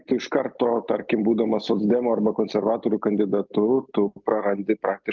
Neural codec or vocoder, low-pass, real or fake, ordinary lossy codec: none; 7.2 kHz; real; Opus, 32 kbps